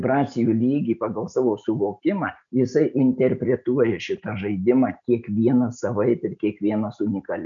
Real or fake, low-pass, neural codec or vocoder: real; 7.2 kHz; none